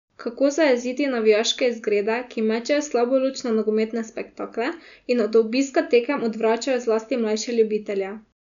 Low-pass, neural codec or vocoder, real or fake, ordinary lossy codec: 7.2 kHz; none; real; none